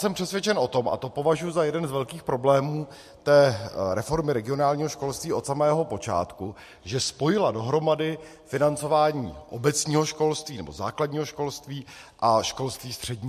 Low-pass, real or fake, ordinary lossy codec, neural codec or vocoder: 14.4 kHz; real; MP3, 64 kbps; none